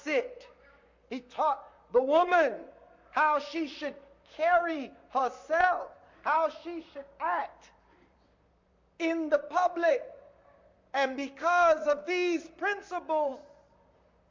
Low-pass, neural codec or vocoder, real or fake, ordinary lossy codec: 7.2 kHz; vocoder, 44.1 kHz, 128 mel bands, Pupu-Vocoder; fake; MP3, 48 kbps